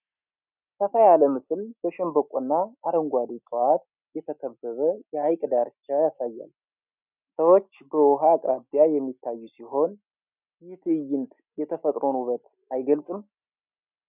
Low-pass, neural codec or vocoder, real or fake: 3.6 kHz; none; real